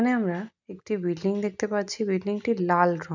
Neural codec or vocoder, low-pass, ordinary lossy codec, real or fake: none; 7.2 kHz; none; real